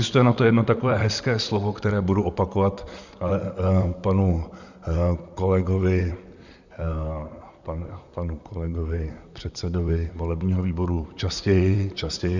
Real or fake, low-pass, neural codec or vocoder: fake; 7.2 kHz; vocoder, 44.1 kHz, 128 mel bands, Pupu-Vocoder